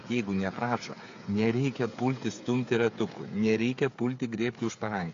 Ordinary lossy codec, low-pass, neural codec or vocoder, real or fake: AAC, 48 kbps; 7.2 kHz; codec, 16 kHz, 8 kbps, FreqCodec, smaller model; fake